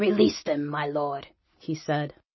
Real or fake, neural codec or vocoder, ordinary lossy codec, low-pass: real; none; MP3, 24 kbps; 7.2 kHz